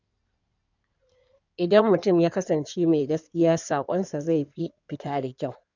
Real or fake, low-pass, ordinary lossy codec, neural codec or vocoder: fake; 7.2 kHz; none; codec, 16 kHz in and 24 kHz out, 2.2 kbps, FireRedTTS-2 codec